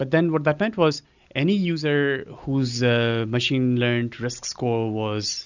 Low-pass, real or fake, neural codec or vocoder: 7.2 kHz; real; none